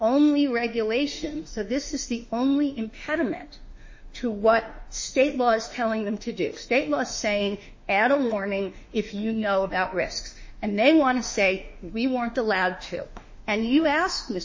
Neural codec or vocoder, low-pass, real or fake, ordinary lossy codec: autoencoder, 48 kHz, 32 numbers a frame, DAC-VAE, trained on Japanese speech; 7.2 kHz; fake; MP3, 32 kbps